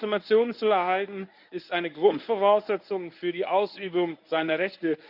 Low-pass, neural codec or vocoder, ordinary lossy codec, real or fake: 5.4 kHz; codec, 24 kHz, 0.9 kbps, WavTokenizer, medium speech release version 1; none; fake